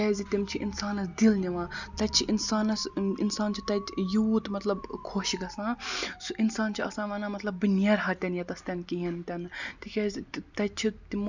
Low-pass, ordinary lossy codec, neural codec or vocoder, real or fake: 7.2 kHz; none; none; real